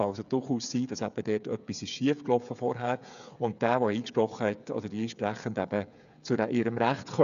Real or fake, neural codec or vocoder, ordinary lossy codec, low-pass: fake; codec, 16 kHz, 8 kbps, FreqCodec, smaller model; none; 7.2 kHz